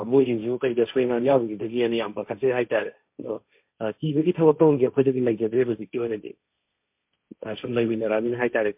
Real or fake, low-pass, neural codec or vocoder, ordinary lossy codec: fake; 3.6 kHz; codec, 16 kHz, 1.1 kbps, Voila-Tokenizer; MP3, 32 kbps